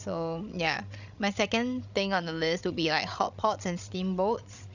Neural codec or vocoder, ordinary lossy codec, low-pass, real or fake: codec, 16 kHz, 16 kbps, FreqCodec, larger model; none; 7.2 kHz; fake